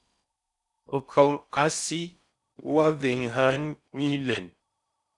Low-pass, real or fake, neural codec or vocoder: 10.8 kHz; fake; codec, 16 kHz in and 24 kHz out, 0.6 kbps, FocalCodec, streaming, 4096 codes